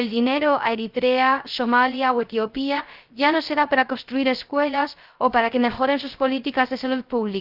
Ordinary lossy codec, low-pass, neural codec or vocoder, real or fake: Opus, 32 kbps; 5.4 kHz; codec, 16 kHz, 0.3 kbps, FocalCodec; fake